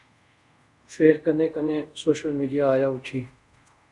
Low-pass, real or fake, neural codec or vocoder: 10.8 kHz; fake; codec, 24 kHz, 0.5 kbps, DualCodec